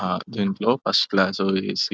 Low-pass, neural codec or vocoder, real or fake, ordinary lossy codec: none; none; real; none